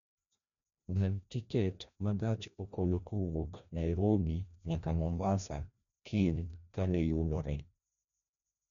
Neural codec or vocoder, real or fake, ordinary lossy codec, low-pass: codec, 16 kHz, 1 kbps, FreqCodec, larger model; fake; none; 7.2 kHz